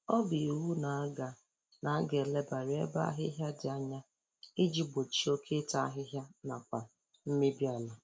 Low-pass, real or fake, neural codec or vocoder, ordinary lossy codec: none; real; none; none